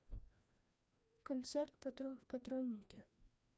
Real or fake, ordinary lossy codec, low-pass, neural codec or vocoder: fake; none; none; codec, 16 kHz, 1 kbps, FreqCodec, larger model